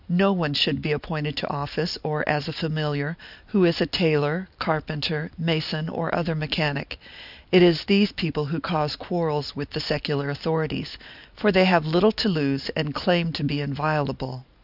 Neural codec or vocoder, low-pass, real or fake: none; 5.4 kHz; real